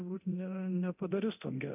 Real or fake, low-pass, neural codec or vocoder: fake; 3.6 kHz; codec, 24 kHz, 0.9 kbps, DualCodec